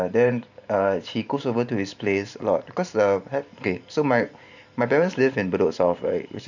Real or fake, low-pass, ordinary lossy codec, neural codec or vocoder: fake; 7.2 kHz; none; vocoder, 44.1 kHz, 128 mel bands every 512 samples, BigVGAN v2